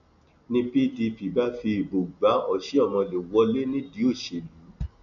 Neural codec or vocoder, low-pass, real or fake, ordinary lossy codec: none; 7.2 kHz; real; none